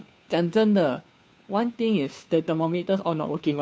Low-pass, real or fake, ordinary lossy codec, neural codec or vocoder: none; fake; none; codec, 16 kHz, 2 kbps, FunCodec, trained on Chinese and English, 25 frames a second